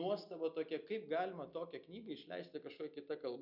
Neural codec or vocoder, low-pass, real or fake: none; 5.4 kHz; real